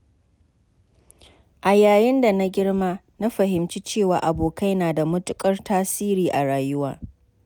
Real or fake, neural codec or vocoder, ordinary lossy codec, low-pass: real; none; none; none